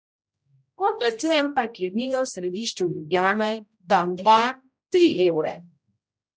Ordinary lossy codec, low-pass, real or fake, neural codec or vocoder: none; none; fake; codec, 16 kHz, 0.5 kbps, X-Codec, HuBERT features, trained on general audio